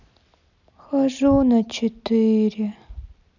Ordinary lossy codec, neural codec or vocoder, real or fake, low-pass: none; none; real; 7.2 kHz